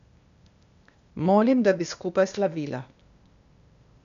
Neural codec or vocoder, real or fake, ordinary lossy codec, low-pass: codec, 16 kHz, 0.8 kbps, ZipCodec; fake; none; 7.2 kHz